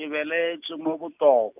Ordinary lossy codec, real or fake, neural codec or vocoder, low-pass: none; real; none; 3.6 kHz